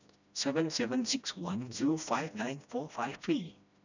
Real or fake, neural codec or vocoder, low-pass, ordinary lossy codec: fake; codec, 16 kHz, 1 kbps, FreqCodec, smaller model; 7.2 kHz; none